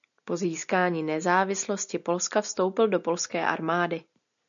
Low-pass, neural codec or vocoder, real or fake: 7.2 kHz; none; real